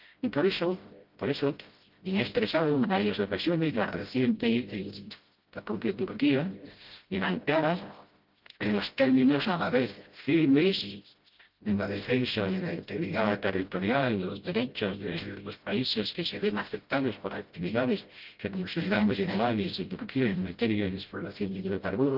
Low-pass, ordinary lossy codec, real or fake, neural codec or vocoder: 5.4 kHz; Opus, 32 kbps; fake; codec, 16 kHz, 0.5 kbps, FreqCodec, smaller model